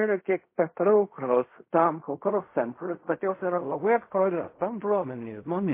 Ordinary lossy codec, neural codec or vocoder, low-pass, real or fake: MP3, 24 kbps; codec, 16 kHz in and 24 kHz out, 0.4 kbps, LongCat-Audio-Codec, fine tuned four codebook decoder; 3.6 kHz; fake